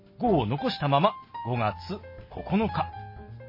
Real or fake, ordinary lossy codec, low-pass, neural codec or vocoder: real; MP3, 24 kbps; 5.4 kHz; none